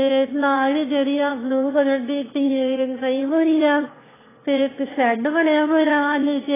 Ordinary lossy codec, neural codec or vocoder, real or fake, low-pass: AAC, 16 kbps; autoencoder, 22.05 kHz, a latent of 192 numbers a frame, VITS, trained on one speaker; fake; 3.6 kHz